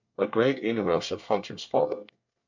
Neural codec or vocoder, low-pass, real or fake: codec, 24 kHz, 1 kbps, SNAC; 7.2 kHz; fake